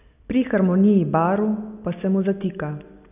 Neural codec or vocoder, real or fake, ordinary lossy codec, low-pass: none; real; none; 3.6 kHz